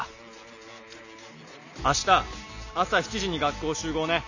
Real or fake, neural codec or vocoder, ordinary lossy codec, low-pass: real; none; none; 7.2 kHz